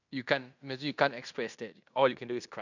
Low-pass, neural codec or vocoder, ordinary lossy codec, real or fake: 7.2 kHz; codec, 16 kHz in and 24 kHz out, 0.9 kbps, LongCat-Audio-Codec, fine tuned four codebook decoder; none; fake